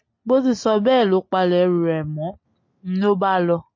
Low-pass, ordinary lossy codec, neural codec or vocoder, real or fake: 7.2 kHz; MP3, 32 kbps; none; real